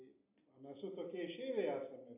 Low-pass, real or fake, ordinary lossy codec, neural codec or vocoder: 3.6 kHz; real; AAC, 24 kbps; none